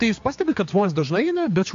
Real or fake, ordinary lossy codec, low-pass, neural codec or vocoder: fake; AAC, 48 kbps; 7.2 kHz; codec, 16 kHz, 4 kbps, X-Codec, HuBERT features, trained on general audio